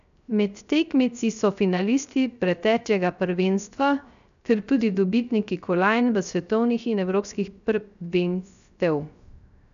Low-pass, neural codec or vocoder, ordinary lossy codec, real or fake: 7.2 kHz; codec, 16 kHz, 0.3 kbps, FocalCodec; none; fake